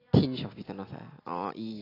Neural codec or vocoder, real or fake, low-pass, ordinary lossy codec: none; real; 5.4 kHz; AAC, 24 kbps